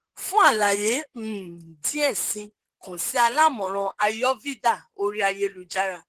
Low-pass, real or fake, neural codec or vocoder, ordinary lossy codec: 14.4 kHz; fake; vocoder, 44.1 kHz, 128 mel bands, Pupu-Vocoder; Opus, 16 kbps